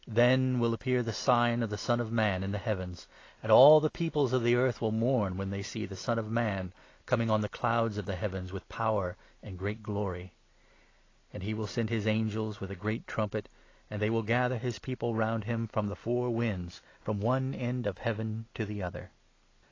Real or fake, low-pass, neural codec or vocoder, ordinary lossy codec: real; 7.2 kHz; none; AAC, 32 kbps